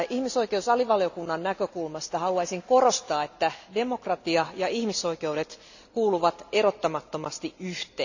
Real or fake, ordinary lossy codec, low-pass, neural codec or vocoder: real; none; 7.2 kHz; none